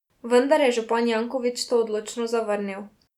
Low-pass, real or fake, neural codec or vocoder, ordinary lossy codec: 19.8 kHz; real; none; none